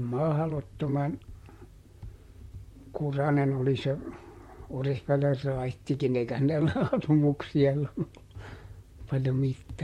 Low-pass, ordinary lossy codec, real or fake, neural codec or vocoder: 19.8 kHz; MP3, 64 kbps; fake; vocoder, 44.1 kHz, 128 mel bands, Pupu-Vocoder